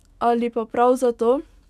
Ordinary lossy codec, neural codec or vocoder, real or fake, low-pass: none; autoencoder, 48 kHz, 128 numbers a frame, DAC-VAE, trained on Japanese speech; fake; 14.4 kHz